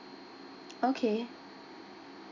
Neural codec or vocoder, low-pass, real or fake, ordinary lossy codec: none; 7.2 kHz; real; none